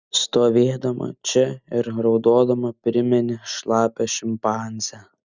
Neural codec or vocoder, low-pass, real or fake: none; 7.2 kHz; real